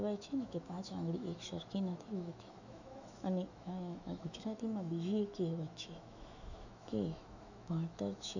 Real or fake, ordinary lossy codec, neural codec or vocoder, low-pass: fake; none; autoencoder, 48 kHz, 128 numbers a frame, DAC-VAE, trained on Japanese speech; 7.2 kHz